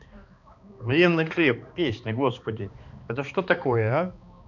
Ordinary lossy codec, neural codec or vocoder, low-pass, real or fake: none; codec, 16 kHz, 2 kbps, X-Codec, HuBERT features, trained on balanced general audio; 7.2 kHz; fake